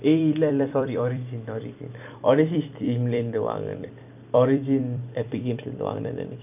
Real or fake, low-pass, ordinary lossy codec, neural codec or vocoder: fake; 3.6 kHz; none; vocoder, 44.1 kHz, 128 mel bands every 256 samples, BigVGAN v2